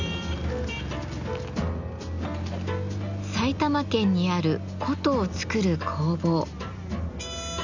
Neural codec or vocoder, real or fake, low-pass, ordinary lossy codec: none; real; 7.2 kHz; none